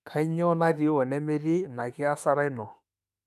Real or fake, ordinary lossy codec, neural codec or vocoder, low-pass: fake; none; autoencoder, 48 kHz, 32 numbers a frame, DAC-VAE, trained on Japanese speech; 14.4 kHz